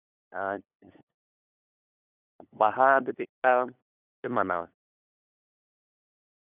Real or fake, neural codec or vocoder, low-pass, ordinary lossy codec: fake; codec, 16 kHz, 8 kbps, FunCodec, trained on LibriTTS, 25 frames a second; 3.6 kHz; none